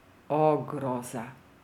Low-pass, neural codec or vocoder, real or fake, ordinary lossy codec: 19.8 kHz; none; real; none